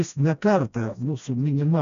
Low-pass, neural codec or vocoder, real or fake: 7.2 kHz; codec, 16 kHz, 1 kbps, FreqCodec, smaller model; fake